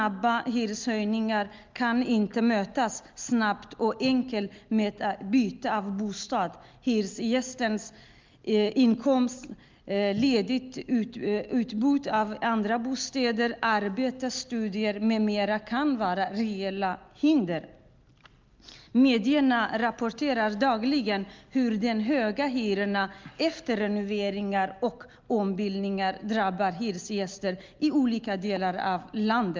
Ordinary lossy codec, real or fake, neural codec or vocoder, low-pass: Opus, 24 kbps; real; none; 7.2 kHz